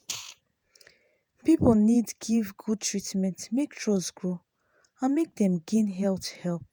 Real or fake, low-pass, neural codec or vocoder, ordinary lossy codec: fake; none; vocoder, 48 kHz, 128 mel bands, Vocos; none